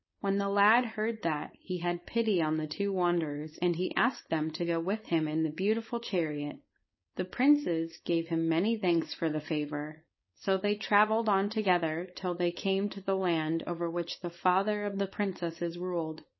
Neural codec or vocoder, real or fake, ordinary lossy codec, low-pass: codec, 16 kHz, 4.8 kbps, FACodec; fake; MP3, 24 kbps; 7.2 kHz